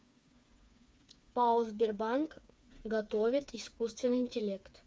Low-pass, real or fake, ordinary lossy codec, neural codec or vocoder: none; fake; none; codec, 16 kHz, 4 kbps, FreqCodec, smaller model